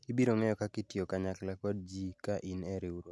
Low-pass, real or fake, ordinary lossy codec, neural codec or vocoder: none; real; none; none